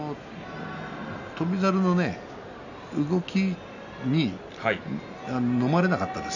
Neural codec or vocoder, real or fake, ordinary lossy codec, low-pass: none; real; none; 7.2 kHz